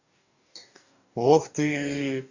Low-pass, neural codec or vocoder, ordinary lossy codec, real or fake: 7.2 kHz; codec, 44.1 kHz, 2.6 kbps, DAC; none; fake